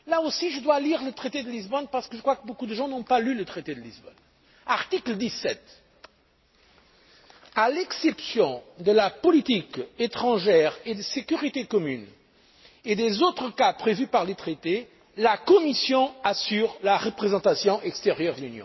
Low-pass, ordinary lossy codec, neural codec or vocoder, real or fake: 7.2 kHz; MP3, 24 kbps; none; real